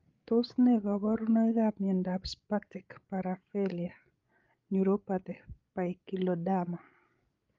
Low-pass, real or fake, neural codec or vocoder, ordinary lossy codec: 7.2 kHz; fake; codec, 16 kHz, 16 kbps, FreqCodec, larger model; Opus, 32 kbps